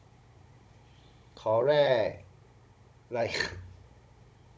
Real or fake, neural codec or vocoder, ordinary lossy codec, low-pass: fake; codec, 16 kHz, 16 kbps, FunCodec, trained on Chinese and English, 50 frames a second; none; none